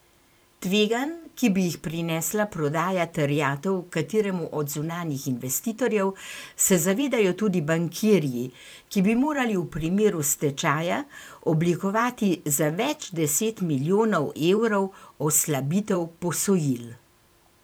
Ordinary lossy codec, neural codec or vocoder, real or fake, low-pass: none; vocoder, 44.1 kHz, 128 mel bands every 512 samples, BigVGAN v2; fake; none